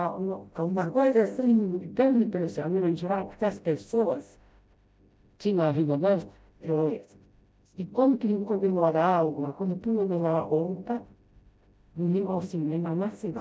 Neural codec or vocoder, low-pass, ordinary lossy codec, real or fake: codec, 16 kHz, 0.5 kbps, FreqCodec, smaller model; none; none; fake